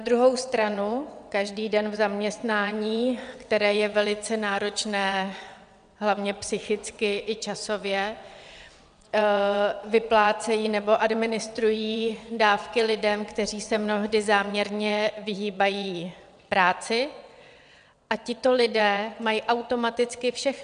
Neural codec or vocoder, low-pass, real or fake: vocoder, 22.05 kHz, 80 mel bands, WaveNeXt; 9.9 kHz; fake